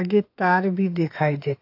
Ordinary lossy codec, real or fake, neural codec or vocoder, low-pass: AAC, 32 kbps; fake; autoencoder, 48 kHz, 32 numbers a frame, DAC-VAE, trained on Japanese speech; 5.4 kHz